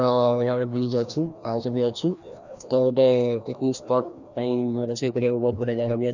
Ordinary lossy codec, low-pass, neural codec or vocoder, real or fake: none; 7.2 kHz; codec, 16 kHz, 1 kbps, FreqCodec, larger model; fake